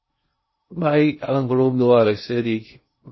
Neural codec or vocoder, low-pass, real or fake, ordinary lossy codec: codec, 16 kHz in and 24 kHz out, 0.6 kbps, FocalCodec, streaming, 2048 codes; 7.2 kHz; fake; MP3, 24 kbps